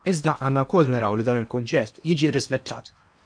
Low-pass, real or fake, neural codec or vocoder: 9.9 kHz; fake; codec, 16 kHz in and 24 kHz out, 0.8 kbps, FocalCodec, streaming, 65536 codes